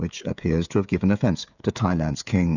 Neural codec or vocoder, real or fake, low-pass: codec, 16 kHz, 16 kbps, FreqCodec, smaller model; fake; 7.2 kHz